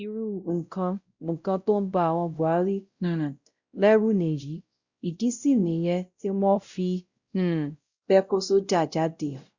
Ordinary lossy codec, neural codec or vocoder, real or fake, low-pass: Opus, 64 kbps; codec, 16 kHz, 0.5 kbps, X-Codec, WavLM features, trained on Multilingual LibriSpeech; fake; 7.2 kHz